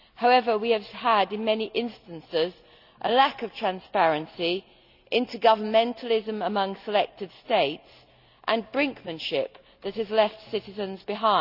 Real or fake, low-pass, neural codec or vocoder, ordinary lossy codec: real; 5.4 kHz; none; none